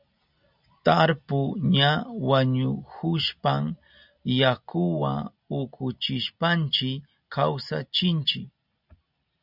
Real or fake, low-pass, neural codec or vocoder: real; 5.4 kHz; none